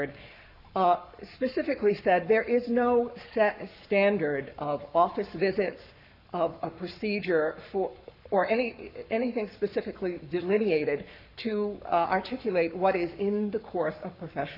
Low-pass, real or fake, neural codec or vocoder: 5.4 kHz; fake; codec, 44.1 kHz, 7.8 kbps, Pupu-Codec